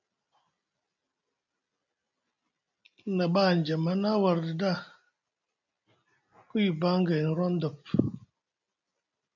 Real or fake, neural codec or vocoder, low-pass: real; none; 7.2 kHz